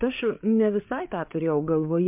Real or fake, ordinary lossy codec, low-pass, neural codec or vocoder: fake; MP3, 32 kbps; 3.6 kHz; codec, 16 kHz, 8 kbps, FreqCodec, larger model